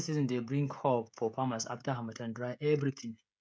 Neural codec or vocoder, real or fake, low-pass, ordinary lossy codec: codec, 16 kHz, 16 kbps, FreqCodec, smaller model; fake; none; none